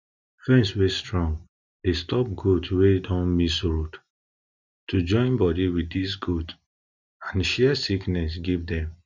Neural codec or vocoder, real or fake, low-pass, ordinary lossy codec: none; real; 7.2 kHz; none